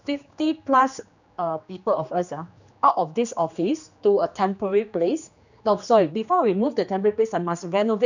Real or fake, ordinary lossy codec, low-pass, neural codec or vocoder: fake; none; 7.2 kHz; codec, 16 kHz, 2 kbps, X-Codec, HuBERT features, trained on general audio